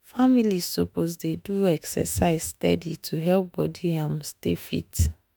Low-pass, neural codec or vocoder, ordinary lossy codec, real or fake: none; autoencoder, 48 kHz, 32 numbers a frame, DAC-VAE, trained on Japanese speech; none; fake